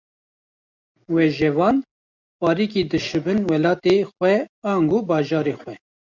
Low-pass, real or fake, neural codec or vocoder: 7.2 kHz; real; none